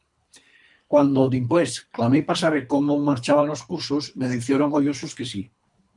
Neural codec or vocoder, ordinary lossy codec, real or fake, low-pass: codec, 24 kHz, 3 kbps, HILCodec; MP3, 96 kbps; fake; 10.8 kHz